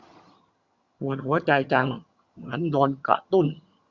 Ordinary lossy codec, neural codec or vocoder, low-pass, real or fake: Opus, 64 kbps; vocoder, 22.05 kHz, 80 mel bands, HiFi-GAN; 7.2 kHz; fake